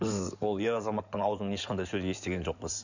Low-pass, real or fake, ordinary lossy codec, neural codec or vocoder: 7.2 kHz; fake; none; codec, 16 kHz in and 24 kHz out, 2.2 kbps, FireRedTTS-2 codec